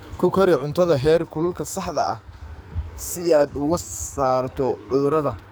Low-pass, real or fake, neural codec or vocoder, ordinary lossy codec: none; fake; codec, 44.1 kHz, 2.6 kbps, SNAC; none